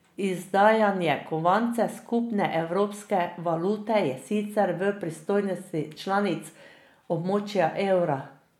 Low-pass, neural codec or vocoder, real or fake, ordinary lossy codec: 19.8 kHz; none; real; MP3, 96 kbps